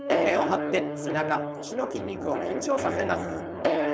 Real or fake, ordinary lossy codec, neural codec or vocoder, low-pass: fake; none; codec, 16 kHz, 4.8 kbps, FACodec; none